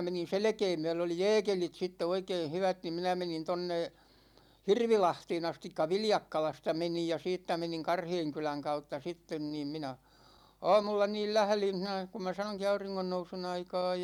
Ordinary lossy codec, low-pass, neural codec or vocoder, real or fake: none; 19.8 kHz; none; real